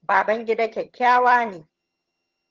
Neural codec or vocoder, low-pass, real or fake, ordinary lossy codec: vocoder, 22.05 kHz, 80 mel bands, HiFi-GAN; 7.2 kHz; fake; Opus, 16 kbps